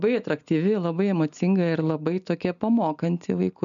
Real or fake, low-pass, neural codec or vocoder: real; 7.2 kHz; none